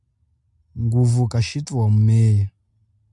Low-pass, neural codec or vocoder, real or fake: 10.8 kHz; none; real